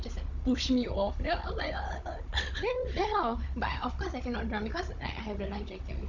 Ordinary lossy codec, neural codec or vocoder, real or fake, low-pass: none; codec, 16 kHz, 16 kbps, FunCodec, trained on Chinese and English, 50 frames a second; fake; 7.2 kHz